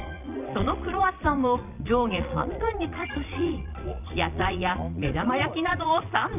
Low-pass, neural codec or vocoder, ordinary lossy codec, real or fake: 3.6 kHz; vocoder, 22.05 kHz, 80 mel bands, Vocos; none; fake